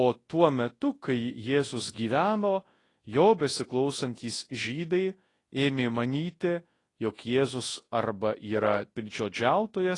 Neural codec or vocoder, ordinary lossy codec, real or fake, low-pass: codec, 24 kHz, 0.9 kbps, WavTokenizer, large speech release; AAC, 32 kbps; fake; 10.8 kHz